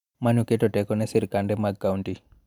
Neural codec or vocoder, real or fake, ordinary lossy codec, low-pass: vocoder, 44.1 kHz, 128 mel bands every 512 samples, BigVGAN v2; fake; none; 19.8 kHz